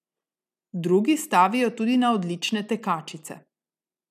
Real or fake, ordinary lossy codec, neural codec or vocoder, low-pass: real; none; none; 14.4 kHz